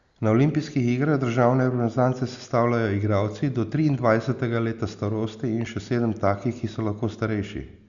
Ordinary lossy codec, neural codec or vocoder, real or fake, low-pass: Opus, 64 kbps; none; real; 7.2 kHz